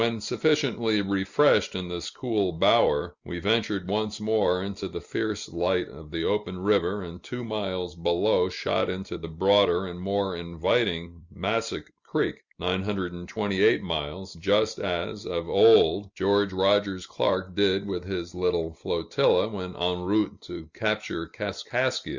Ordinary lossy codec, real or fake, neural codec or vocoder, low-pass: Opus, 64 kbps; real; none; 7.2 kHz